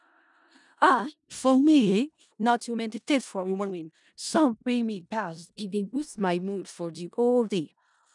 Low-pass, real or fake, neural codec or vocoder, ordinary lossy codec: 10.8 kHz; fake; codec, 16 kHz in and 24 kHz out, 0.4 kbps, LongCat-Audio-Codec, four codebook decoder; none